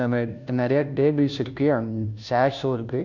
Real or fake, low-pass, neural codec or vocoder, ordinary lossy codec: fake; 7.2 kHz; codec, 16 kHz, 0.5 kbps, FunCodec, trained on Chinese and English, 25 frames a second; none